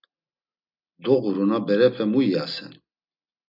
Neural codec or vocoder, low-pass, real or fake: none; 5.4 kHz; real